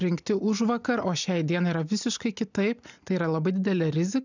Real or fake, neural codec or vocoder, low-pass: real; none; 7.2 kHz